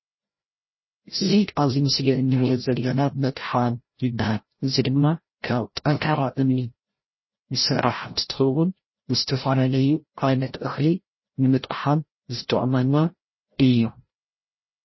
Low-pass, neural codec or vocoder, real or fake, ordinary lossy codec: 7.2 kHz; codec, 16 kHz, 0.5 kbps, FreqCodec, larger model; fake; MP3, 24 kbps